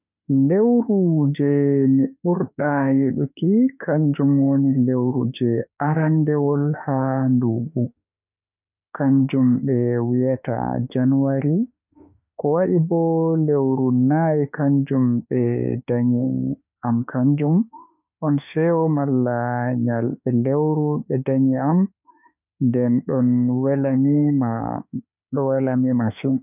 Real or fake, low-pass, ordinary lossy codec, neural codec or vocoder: fake; 3.6 kHz; none; autoencoder, 48 kHz, 32 numbers a frame, DAC-VAE, trained on Japanese speech